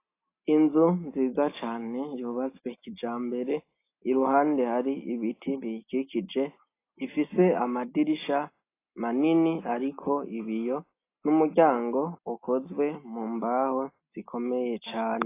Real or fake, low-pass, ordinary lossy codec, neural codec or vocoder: real; 3.6 kHz; AAC, 24 kbps; none